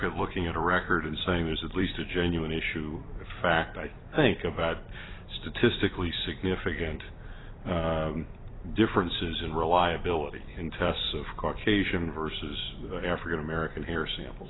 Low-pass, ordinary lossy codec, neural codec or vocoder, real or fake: 7.2 kHz; AAC, 16 kbps; vocoder, 44.1 kHz, 128 mel bands every 256 samples, BigVGAN v2; fake